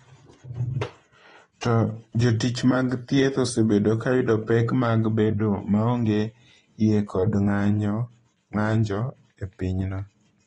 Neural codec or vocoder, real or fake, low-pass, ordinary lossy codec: vocoder, 24 kHz, 100 mel bands, Vocos; fake; 10.8 kHz; AAC, 32 kbps